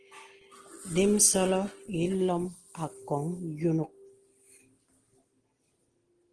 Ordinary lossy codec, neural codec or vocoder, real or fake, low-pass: Opus, 16 kbps; none; real; 9.9 kHz